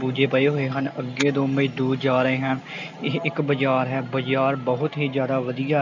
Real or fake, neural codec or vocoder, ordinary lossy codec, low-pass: real; none; none; 7.2 kHz